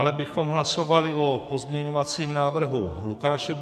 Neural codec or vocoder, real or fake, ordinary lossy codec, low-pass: codec, 44.1 kHz, 2.6 kbps, SNAC; fake; MP3, 96 kbps; 14.4 kHz